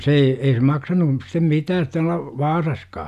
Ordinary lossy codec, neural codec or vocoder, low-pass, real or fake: none; none; 14.4 kHz; real